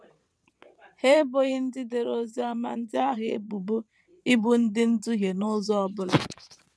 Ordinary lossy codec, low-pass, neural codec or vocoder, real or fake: none; none; vocoder, 22.05 kHz, 80 mel bands, WaveNeXt; fake